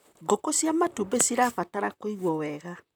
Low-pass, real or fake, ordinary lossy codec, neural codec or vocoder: none; fake; none; vocoder, 44.1 kHz, 128 mel bands, Pupu-Vocoder